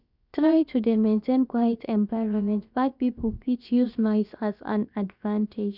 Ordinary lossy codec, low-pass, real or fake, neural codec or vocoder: none; 5.4 kHz; fake; codec, 16 kHz, about 1 kbps, DyCAST, with the encoder's durations